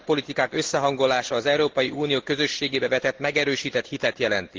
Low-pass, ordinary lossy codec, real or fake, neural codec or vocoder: 7.2 kHz; Opus, 16 kbps; real; none